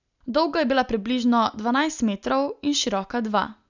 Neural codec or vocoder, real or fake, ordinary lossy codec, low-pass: none; real; none; 7.2 kHz